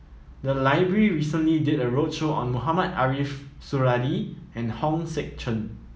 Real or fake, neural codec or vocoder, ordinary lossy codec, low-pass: real; none; none; none